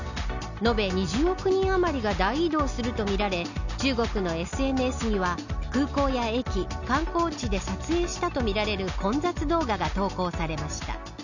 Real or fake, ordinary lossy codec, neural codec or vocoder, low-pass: real; none; none; 7.2 kHz